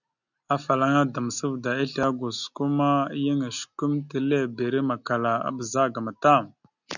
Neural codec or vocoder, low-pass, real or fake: none; 7.2 kHz; real